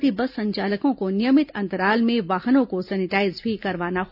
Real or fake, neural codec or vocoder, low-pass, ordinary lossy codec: real; none; 5.4 kHz; none